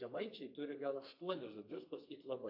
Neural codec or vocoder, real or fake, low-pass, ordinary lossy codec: codec, 32 kHz, 1.9 kbps, SNAC; fake; 5.4 kHz; AAC, 48 kbps